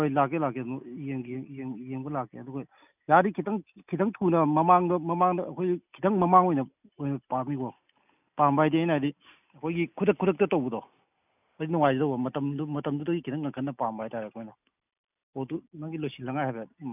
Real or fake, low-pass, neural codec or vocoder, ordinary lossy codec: real; 3.6 kHz; none; none